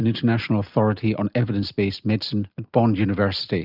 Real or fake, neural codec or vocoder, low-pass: real; none; 5.4 kHz